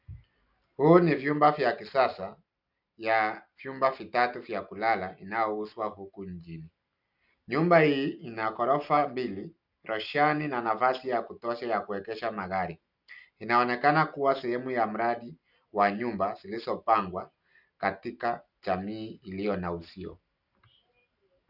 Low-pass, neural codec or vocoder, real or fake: 5.4 kHz; none; real